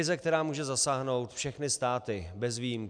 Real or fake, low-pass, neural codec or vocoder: real; 9.9 kHz; none